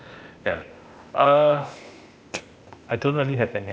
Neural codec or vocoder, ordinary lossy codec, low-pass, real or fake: codec, 16 kHz, 0.8 kbps, ZipCodec; none; none; fake